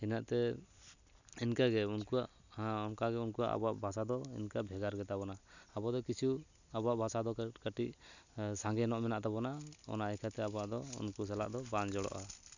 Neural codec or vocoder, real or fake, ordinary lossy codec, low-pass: none; real; none; 7.2 kHz